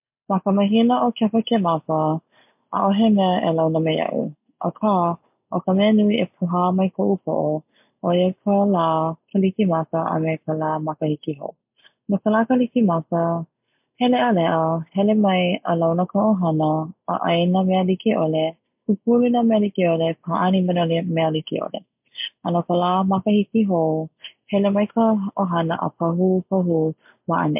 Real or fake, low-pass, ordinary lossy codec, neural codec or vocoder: real; 3.6 kHz; MP3, 32 kbps; none